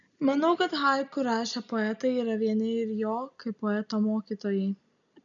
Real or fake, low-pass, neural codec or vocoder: real; 7.2 kHz; none